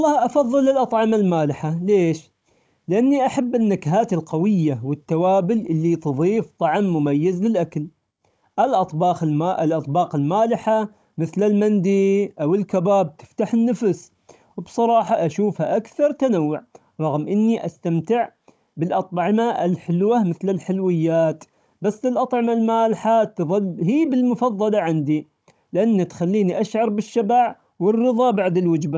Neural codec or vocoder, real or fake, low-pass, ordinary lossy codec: codec, 16 kHz, 16 kbps, FunCodec, trained on Chinese and English, 50 frames a second; fake; none; none